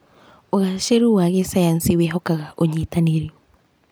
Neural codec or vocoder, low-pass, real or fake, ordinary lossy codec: none; none; real; none